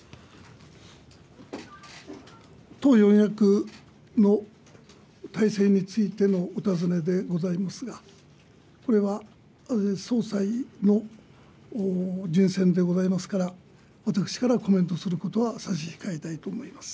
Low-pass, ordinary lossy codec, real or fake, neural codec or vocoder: none; none; real; none